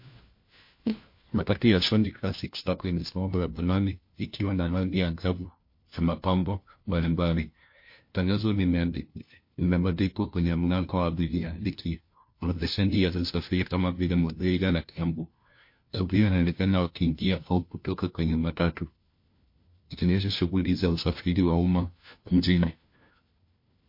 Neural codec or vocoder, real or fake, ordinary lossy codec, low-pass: codec, 16 kHz, 1 kbps, FunCodec, trained on LibriTTS, 50 frames a second; fake; MP3, 32 kbps; 5.4 kHz